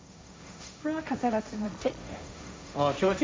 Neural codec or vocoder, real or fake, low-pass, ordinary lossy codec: codec, 16 kHz, 1.1 kbps, Voila-Tokenizer; fake; none; none